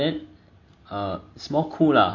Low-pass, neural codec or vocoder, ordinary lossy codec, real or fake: 7.2 kHz; none; none; real